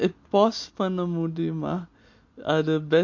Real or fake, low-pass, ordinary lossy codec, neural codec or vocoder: real; 7.2 kHz; MP3, 48 kbps; none